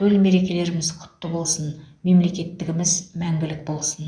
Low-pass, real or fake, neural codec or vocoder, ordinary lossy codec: 9.9 kHz; fake; vocoder, 24 kHz, 100 mel bands, Vocos; none